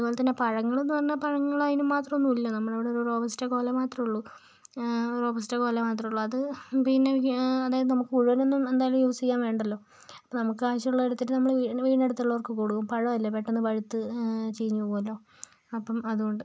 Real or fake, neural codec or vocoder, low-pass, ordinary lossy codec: real; none; none; none